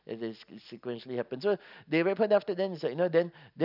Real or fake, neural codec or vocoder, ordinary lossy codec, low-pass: real; none; none; 5.4 kHz